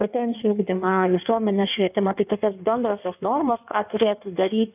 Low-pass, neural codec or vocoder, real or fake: 3.6 kHz; codec, 16 kHz in and 24 kHz out, 1.1 kbps, FireRedTTS-2 codec; fake